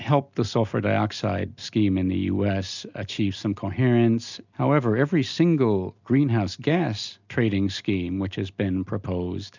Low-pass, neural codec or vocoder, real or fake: 7.2 kHz; none; real